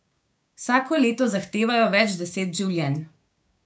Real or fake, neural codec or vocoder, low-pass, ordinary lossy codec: fake; codec, 16 kHz, 6 kbps, DAC; none; none